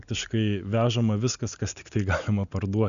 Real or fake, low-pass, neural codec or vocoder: real; 7.2 kHz; none